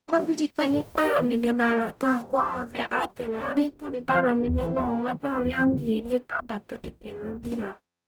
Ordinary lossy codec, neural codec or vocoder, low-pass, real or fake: none; codec, 44.1 kHz, 0.9 kbps, DAC; none; fake